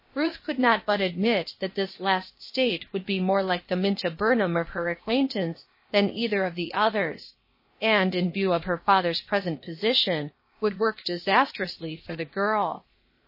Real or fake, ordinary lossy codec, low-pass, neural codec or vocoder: fake; MP3, 24 kbps; 5.4 kHz; codec, 16 kHz, 0.8 kbps, ZipCodec